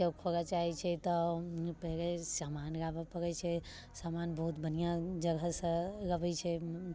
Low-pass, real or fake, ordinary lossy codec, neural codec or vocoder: none; real; none; none